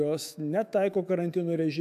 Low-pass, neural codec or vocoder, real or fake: 14.4 kHz; autoencoder, 48 kHz, 128 numbers a frame, DAC-VAE, trained on Japanese speech; fake